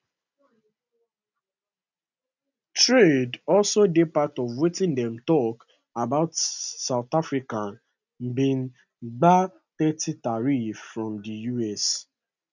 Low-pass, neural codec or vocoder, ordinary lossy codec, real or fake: 7.2 kHz; none; none; real